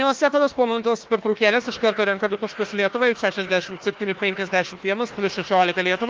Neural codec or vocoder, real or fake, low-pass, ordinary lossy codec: codec, 16 kHz, 1 kbps, FunCodec, trained on Chinese and English, 50 frames a second; fake; 7.2 kHz; Opus, 32 kbps